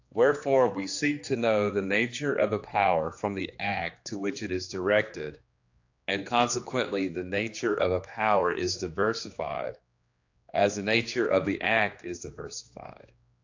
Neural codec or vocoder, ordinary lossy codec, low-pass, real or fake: codec, 16 kHz, 2 kbps, X-Codec, HuBERT features, trained on general audio; AAC, 48 kbps; 7.2 kHz; fake